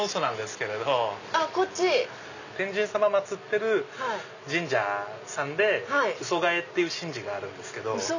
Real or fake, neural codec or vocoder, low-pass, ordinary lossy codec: real; none; 7.2 kHz; none